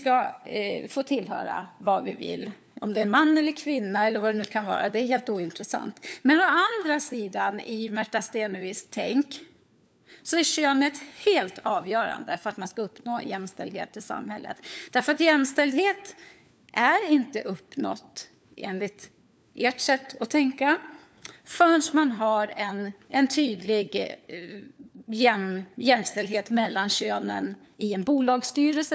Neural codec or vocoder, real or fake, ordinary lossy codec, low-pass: codec, 16 kHz, 4 kbps, FunCodec, trained on LibriTTS, 50 frames a second; fake; none; none